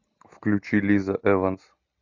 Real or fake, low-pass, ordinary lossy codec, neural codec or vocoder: real; 7.2 kHz; AAC, 48 kbps; none